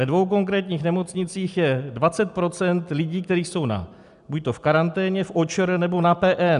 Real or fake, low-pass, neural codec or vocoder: real; 10.8 kHz; none